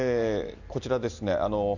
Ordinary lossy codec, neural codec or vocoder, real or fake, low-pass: none; none; real; 7.2 kHz